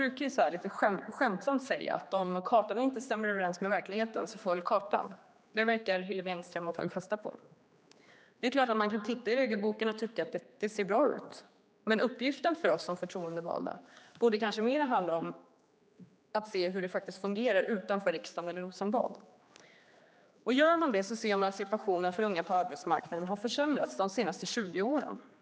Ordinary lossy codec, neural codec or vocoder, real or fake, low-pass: none; codec, 16 kHz, 2 kbps, X-Codec, HuBERT features, trained on general audio; fake; none